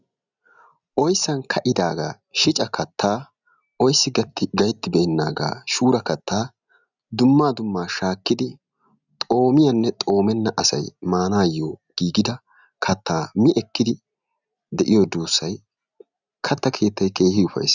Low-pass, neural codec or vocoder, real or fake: 7.2 kHz; none; real